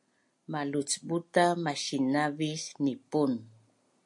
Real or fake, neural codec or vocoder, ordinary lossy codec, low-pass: real; none; MP3, 48 kbps; 10.8 kHz